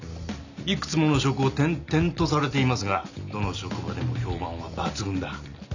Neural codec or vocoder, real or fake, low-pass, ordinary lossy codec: none; real; 7.2 kHz; none